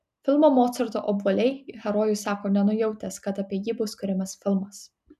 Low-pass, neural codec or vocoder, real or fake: 14.4 kHz; none; real